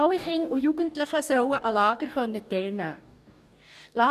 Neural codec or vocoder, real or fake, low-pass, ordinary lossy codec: codec, 44.1 kHz, 2.6 kbps, DAC; fake; 14.4 kHz; none